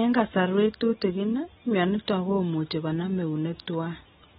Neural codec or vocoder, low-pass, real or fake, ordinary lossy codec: none; 19.8 kHz; real; AAC, 16 kbps